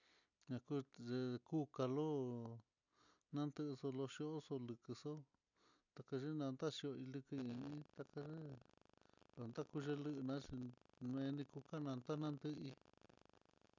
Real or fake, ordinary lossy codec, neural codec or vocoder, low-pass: real; none; none; 7.2 kHz